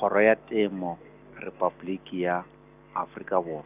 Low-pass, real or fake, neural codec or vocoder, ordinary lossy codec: 3.6 kHz; real; none; none